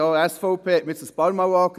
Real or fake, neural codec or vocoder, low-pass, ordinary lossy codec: real; none; 14.4 kHz; none